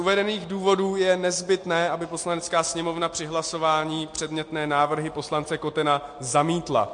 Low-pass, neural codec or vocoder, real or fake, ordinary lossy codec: 9.9 kHz; none; real; MP3, 48 kbps